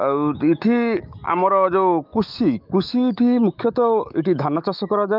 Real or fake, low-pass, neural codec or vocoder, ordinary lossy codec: fake; 5.4 kHz; autoencoder, 48 kHz, 128 numbers a frame, DAC-VAE, trained on Japanese speech; Opus, 24 kbps